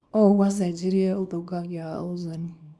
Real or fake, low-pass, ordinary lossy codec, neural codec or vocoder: fake; none; none; codec, 24 kHz, 0.9 kbps, WavTokenizer, small release